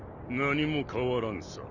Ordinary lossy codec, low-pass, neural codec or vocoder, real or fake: none; 7.2 kHz; none; real